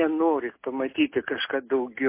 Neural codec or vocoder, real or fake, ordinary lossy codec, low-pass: none; real; MP3, 24 kbps; 3.6 kHz